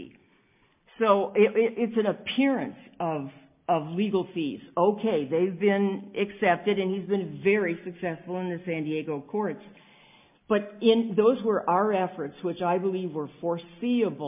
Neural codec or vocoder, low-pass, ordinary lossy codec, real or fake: none; 3.6 kHz; MP3, 32 kbps; real